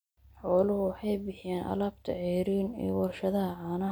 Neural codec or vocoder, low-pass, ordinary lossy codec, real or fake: none; none; none; real